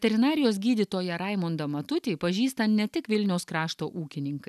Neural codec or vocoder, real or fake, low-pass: none; real; 14.4 kHz